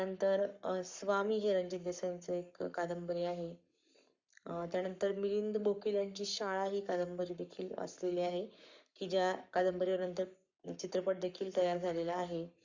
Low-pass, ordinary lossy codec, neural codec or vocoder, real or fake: 7.2 kHz; Opus, 64 kbps; codec, 44.1 kHz, 7.8 kbps, Pupu-Codec; fake